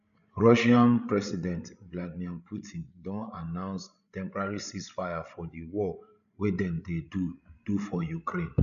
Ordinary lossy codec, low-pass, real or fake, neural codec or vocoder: none; 7.2 kHz; fake; codec, 16 kHz, 16 kbps, FreqCodec, larger model